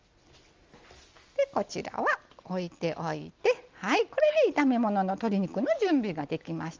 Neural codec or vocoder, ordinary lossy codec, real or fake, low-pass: none; Opus, 32 kbps; real; 7.2 kHz